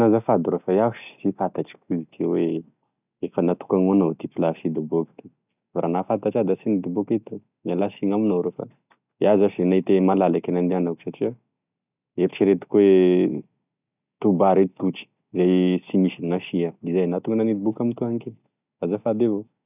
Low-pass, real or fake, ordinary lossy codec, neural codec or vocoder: 3.6 kHz; real; none; none